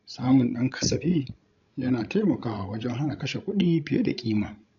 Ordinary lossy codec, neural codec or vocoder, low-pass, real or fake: none; codec, 16 kHz, 16 kbps, FreqCodec, larger model; 7.2 kHz; fake